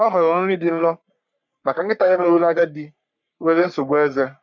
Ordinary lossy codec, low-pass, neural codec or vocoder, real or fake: MP3, 64 kbps; 7.2 kHz; codec, 44.1 kHz, 3.4 kbps, Pupu-Codec; fake